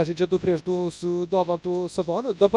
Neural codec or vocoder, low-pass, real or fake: codec, 24 kHz, 0.9 kbps, WavTokenizer, large speech release; 10.8 kHz; fake